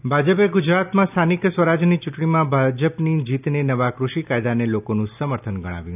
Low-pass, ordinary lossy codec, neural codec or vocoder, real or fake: 3.6 kHz; none; none; real